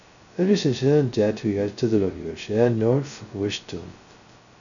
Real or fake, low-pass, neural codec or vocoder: fake; 7.2 kHz; codec, 16 kHz, 0.2 kbps, FocalCodec